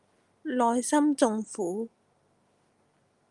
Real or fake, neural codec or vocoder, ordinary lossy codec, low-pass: real; none; Opus, 32 kbps; 10.8 kHz